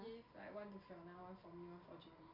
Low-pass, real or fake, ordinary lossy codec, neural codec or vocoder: 5.4 kHz; real; none; none